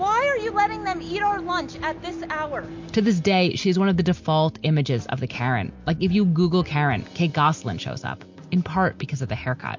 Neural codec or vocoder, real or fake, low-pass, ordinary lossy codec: none; real; 7.2 kHz; MP3, 48 kbps